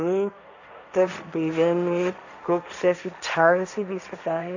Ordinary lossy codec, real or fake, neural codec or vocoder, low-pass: none; fake; codec, 16 kHz, 1.1 kbps, Voila-Tokenizer; 7.2 kHz